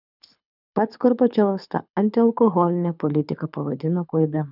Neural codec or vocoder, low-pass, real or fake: codec, 24 kHz, 6 kbps, HILCodec; 5.4 kHz; fake